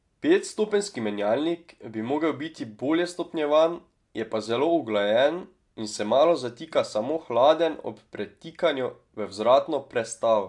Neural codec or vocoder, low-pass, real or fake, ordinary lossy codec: none; 10.8 kHz; real; AAC, 64 kbps